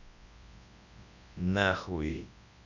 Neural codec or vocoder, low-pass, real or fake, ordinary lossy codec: codec, 24 kHz, 0.9 kbps, WavTokenizer, large speech release; 7.2 kHz; fake; none